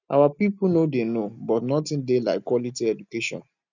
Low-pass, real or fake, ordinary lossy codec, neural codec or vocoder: 7.2 kHz; real; none; none